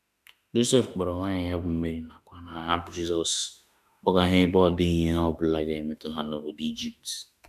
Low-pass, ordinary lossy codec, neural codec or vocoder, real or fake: 14.4 kHz; none; autoencoder, 48 kHz, 32 numbers a frame, DAC-VAE, trained on Japanese speech; fake